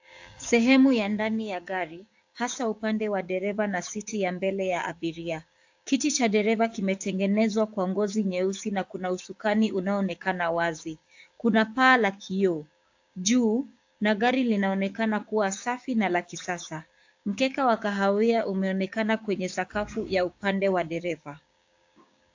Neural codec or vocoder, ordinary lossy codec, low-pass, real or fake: codec, 16 kHz, 6 kbps, DAC; AAC, 48 kbps; 7.2 kHz; fake